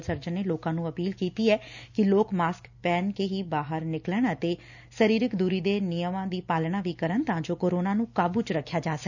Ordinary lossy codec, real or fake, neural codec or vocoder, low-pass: none; real; none; 7.2 kHz